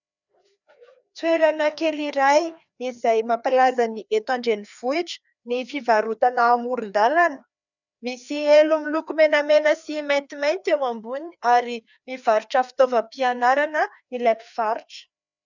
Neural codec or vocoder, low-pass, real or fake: codec, 16 kHz, 2 kbps, FreqCodec, larger model; 7.2 kHz; fake